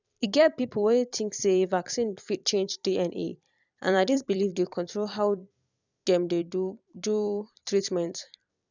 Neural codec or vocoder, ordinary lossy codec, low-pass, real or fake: vocoder, 44.1 kHz, 128 mel bands every 256 samples, BigVGAN v2; none; 7.2 kHz; fake